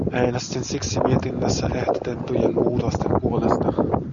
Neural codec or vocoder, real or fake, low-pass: none; real; 7.2 kHz